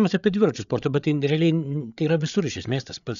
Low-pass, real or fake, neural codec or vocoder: 7.2 kHz; fake; codec, 16 kHz, 8 kbps, FreqCodec, larger model